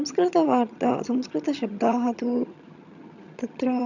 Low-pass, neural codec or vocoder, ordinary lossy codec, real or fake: 7.2 kHz; vocoder, 22.05 kHz, 80 mel bands, HiFi-GAN; none; fake